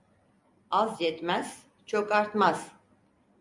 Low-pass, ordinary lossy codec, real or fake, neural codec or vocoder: 10.8 kHz; MP3, 96 kbps; real; none